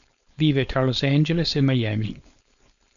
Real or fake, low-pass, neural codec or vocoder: fake; 7.2 kHz; codec, 16 kHz, 4.8 kbps, FACodec